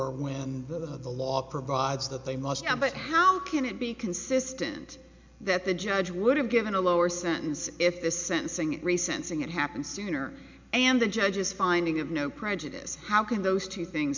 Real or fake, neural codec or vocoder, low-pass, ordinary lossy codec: real; none; 7.2 kHz; MP3, 64 kbps